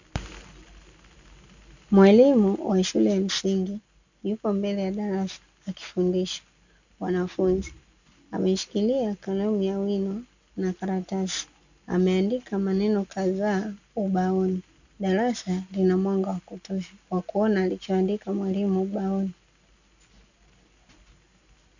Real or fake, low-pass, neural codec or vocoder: real; 7.2 kHz; none